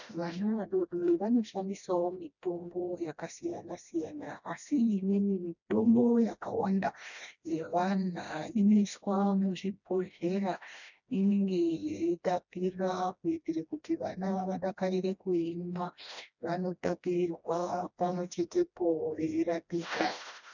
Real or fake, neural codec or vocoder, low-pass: fake; codec, 16 kHz, 1 kbps, FreqCodec, smaller model; 7.2 kHz